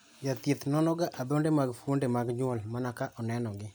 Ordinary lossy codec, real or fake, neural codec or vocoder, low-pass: none; real; none; none